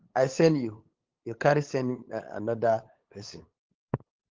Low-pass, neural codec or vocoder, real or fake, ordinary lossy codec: 7.2 kHz; codec, 16 kHz, 8 kbps, FunCodec, trained on LibriTTS, 25 frames a second; fake; Opus, 16 kbps